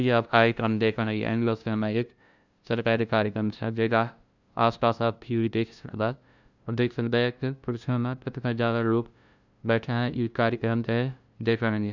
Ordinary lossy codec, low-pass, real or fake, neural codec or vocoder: none; 7.2 kHz; fake; codec, 16 kHz, 0.5 kbps, FunCodec, trained on LibriTTS, 25 frames a second